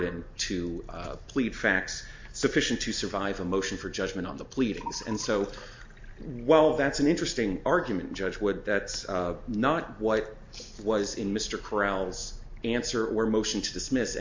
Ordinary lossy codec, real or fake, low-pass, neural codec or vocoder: MP3, 48 kbps; real; 7.2 kHz; none